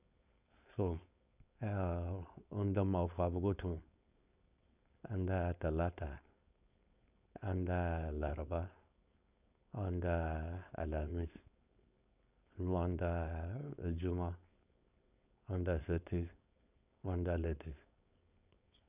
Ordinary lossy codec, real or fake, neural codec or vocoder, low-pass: none; fake; codec, 16 kHz, 4.8 kbps, FACodec; 3.6 kHz